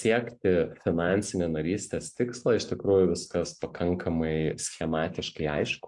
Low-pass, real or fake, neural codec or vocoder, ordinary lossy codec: 10.8 kHz; real; none; AAC, 64 kbps